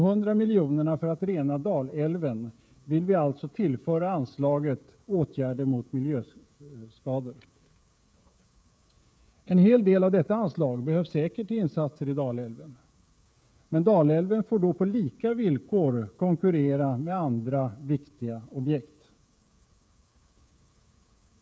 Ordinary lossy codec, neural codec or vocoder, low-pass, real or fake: none; codec, 16 kHz, 16 kbps, FreqCodec, smaller model; none; fake